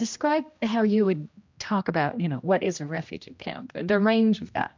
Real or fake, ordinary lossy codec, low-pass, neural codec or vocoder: fake; MP3, 64 kbps; 7.2 kHz; codec, 16 kHz, 1 kbps, X-Codec, HuBERT features, trained on general audio